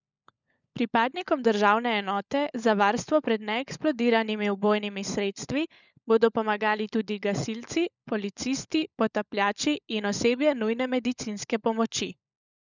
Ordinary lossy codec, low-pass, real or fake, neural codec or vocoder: none; 7.2 kHz; fake; codec, 16 kHz, 16 kbps, FunCodec, trained on LibriTTS, 50 frames a second